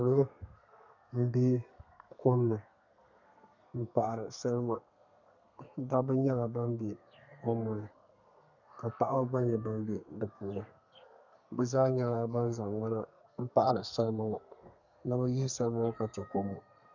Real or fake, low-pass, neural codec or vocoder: fake; 7.2 kHz; codec, 32 kHz, 1.9 kbps, SNAC